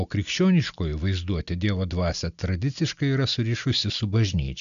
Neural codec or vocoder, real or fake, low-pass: none; real; 7.2 kHz